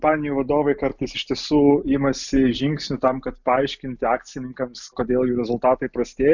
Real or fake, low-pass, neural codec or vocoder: real; 7.2 kHz; none